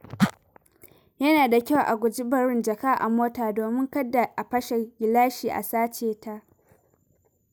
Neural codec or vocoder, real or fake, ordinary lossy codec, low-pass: none; real; none; none